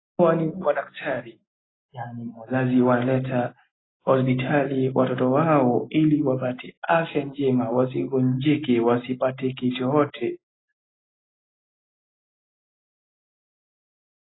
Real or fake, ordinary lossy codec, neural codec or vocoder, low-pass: real; AAC, 16 kbps; none; 7.2 kHz